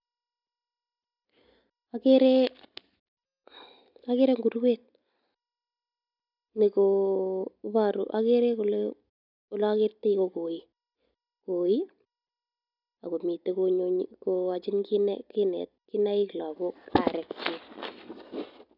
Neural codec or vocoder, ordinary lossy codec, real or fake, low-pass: none; none; real; 5.4 kHz